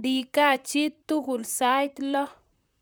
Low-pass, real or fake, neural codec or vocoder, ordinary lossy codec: none; fake; vocoder, 44.1 kHz, 128 mel bands, Pupu-Vocoder; none